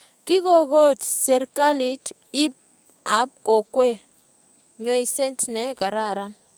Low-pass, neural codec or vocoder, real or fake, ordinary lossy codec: none; codec, 44.1 kHz, 2.6 kbps, SNAC; fake; none